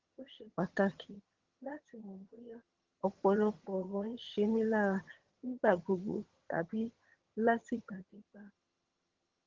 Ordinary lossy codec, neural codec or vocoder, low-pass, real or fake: Opus, 16 kbps; vocoder, 22.05 kHz, 80 mel bands, HiFi-GAN; 7.2 kHz; fake